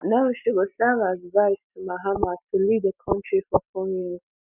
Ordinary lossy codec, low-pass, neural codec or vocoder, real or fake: none; 3.6 kHz; none; real